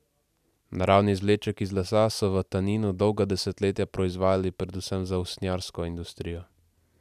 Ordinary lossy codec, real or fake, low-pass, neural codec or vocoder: none; real; 14.4 kHz; none